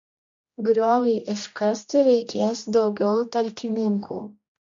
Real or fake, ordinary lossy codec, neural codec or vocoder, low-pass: fake; MP3, 48 kbps; codec, 16 kHz, 1 kbps, X-Codec, HuBERT features, trained on general audio; 7.2 kHz